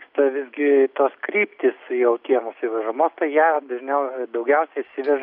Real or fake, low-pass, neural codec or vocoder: real; 5.4 kHz; none